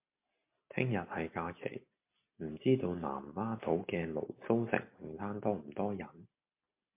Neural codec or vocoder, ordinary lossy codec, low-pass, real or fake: none; MP3, 32 kbps; 3.6 kHz; real